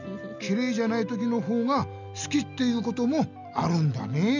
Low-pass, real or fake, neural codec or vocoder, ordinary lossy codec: 7.2 kHz; real; none; none